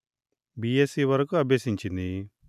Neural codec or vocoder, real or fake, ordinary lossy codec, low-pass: none; real; none; 14.4 kHz